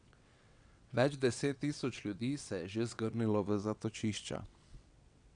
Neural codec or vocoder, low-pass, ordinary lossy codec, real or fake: vocoder, 22.05 kHz, 80 mel bands, WaveNeXt; 9.9 kHz; none; fake